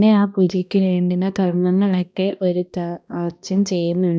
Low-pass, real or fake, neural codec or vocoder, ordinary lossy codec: none; fake; codec, 16 kHz, 1 kbps, X-Codec, HuBERT features, trained on balanced general audio; none